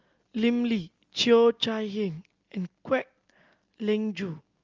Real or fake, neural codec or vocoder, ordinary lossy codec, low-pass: real; none; Opus, 32 kbps; 7.2 kHz